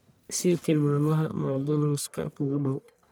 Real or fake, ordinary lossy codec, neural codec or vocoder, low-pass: fake; none; codec, 44.1 kHz, 1.7 kbps, Pupu-Codec; none